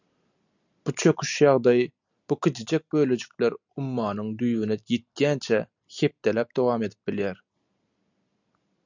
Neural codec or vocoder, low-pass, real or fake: none; 7.2 kHz; real